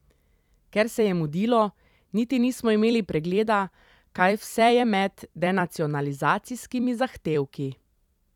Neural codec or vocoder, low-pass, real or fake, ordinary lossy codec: vocoder, 44.1 kHz, 128 mel bands every 256 samples, BigVGAN v2; 19.8 kHz; fake; none